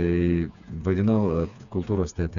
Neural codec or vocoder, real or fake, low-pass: codec, 16 kHz, 4 kbps, FreqCodec, smaller model; fake; 7.2 kHz